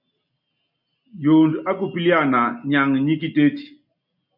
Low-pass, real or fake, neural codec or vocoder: 5.4 kHz; real; none